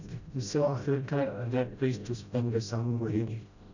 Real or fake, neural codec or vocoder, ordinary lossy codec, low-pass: fake; codec, 16 kHz, 0.5 kbps, FreqCodec, smaller model; none; 7.2 kHz